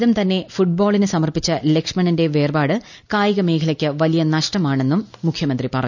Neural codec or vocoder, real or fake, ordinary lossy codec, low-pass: none; real; none; 7.2 kHz